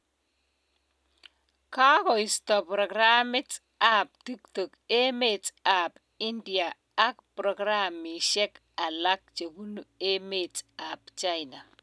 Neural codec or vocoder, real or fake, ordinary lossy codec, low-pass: none; real; none; none